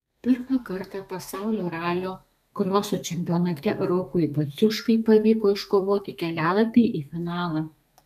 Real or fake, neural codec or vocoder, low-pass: fake; codec, 32 kHz, 1.9 kbps, SNAC; 14.4 kHz